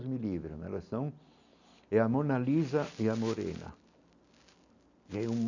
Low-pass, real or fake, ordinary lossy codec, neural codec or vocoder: 7.2 kHz; real; none; none